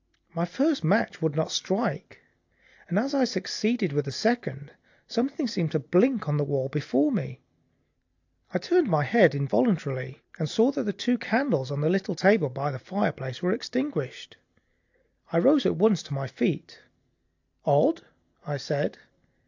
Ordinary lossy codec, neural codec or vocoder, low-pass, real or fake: AAC, 48 kbps; none; 7.2 kHz; real